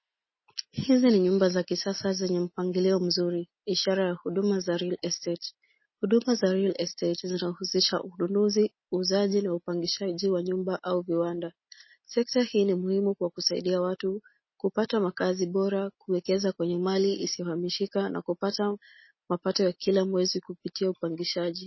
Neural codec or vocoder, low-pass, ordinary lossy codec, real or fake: none; 7.2 kHz; MP3, 24 kbps; real